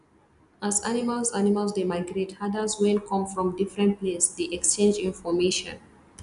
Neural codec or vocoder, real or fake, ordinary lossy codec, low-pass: none; real; none; 10.8 kHz